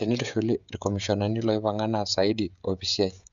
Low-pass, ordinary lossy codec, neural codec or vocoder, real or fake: 7.2 kHz; none; codec, 16 kHz, 16 kbps, FreqCodec, smaller model; fake